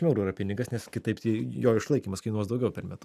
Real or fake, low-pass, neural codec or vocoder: real; 14.4 kHz; none